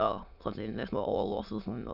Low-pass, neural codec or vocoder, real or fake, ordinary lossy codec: 5.4 kHz; autoencoder, 22.05 kHz, a latent of 192 numbers a frame, VITS, trained on many speakers; fake; none